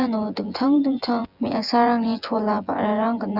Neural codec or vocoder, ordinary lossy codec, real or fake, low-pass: vocoder, 24 kHz, 100 mel bands, Vocos; Opus, 64 kbps; fake; 5.4 kHz